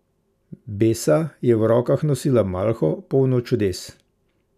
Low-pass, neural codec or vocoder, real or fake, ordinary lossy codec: 14.4 kHz; none; real; none